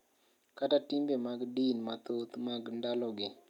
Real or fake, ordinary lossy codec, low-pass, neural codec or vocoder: real; none; 19.8 kHz; none